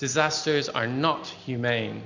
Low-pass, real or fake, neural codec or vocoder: 7.2 kHz; real; none